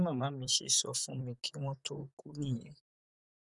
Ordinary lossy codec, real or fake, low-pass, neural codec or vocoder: none; fake; 10.8 kHz; vocoder, 44.1 kHz, 128 mel bands, Pupu-Vocoder